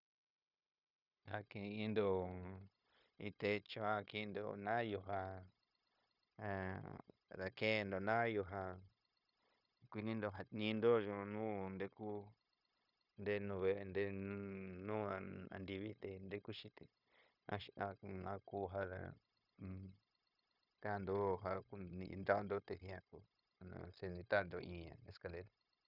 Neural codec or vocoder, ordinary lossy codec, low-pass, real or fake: codec, 16 kHz, 0.9 kbps, LongCat-Audio-Codec; none; 5.4 kHz; fake